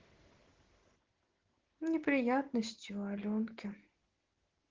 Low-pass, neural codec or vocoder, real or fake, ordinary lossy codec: 7.2 kHz; none; real; Opus, 16 kbps